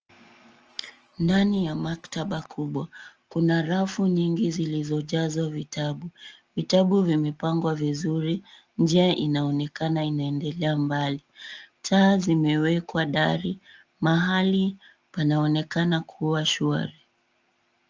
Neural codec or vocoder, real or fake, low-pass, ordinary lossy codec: none; real; 7.2 kHz; Opus, 24 kbps